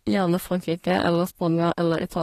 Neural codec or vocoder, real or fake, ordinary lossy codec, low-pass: codec, 32 kHz, 1.9 kbps, SNAC; fake; AAC, 48 kbps; 14.4 kHz